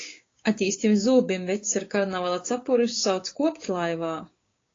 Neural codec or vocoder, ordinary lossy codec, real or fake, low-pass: codec, 16 kHz, 6 kbps, DAC; AAC, 32 kbps; fake; 7.2 kHz